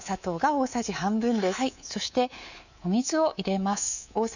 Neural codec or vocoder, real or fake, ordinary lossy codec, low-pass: codec, 24 kHz, 3.1 kbps, DualCodec; fake; none; 7.2 kHz